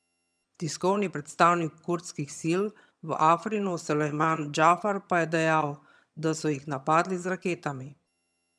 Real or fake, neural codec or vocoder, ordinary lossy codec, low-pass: fake; vocoder, 22.05 kHz, 80 mel bands, HiFi-GAN; none; none